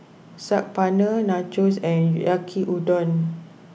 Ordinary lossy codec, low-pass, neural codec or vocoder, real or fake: none; none; none; real